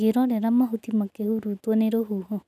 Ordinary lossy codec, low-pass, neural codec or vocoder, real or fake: none; 14.4 kHz; none; real